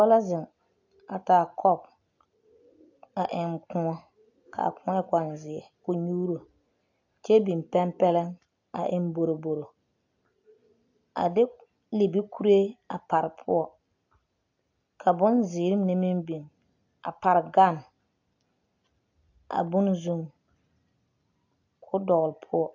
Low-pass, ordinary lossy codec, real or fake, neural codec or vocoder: 7.2 kHz; AAC, 48 kbps; real; none